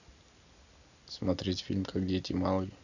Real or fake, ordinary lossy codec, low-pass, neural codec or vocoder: real; none; 7.2 kHz; none